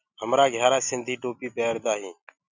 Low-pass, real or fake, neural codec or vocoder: 7.2 kHz; real; none